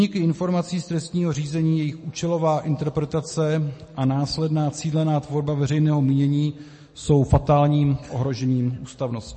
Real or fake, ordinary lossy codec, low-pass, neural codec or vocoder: real; MP3, 32 kbps; 10.8 kHz; none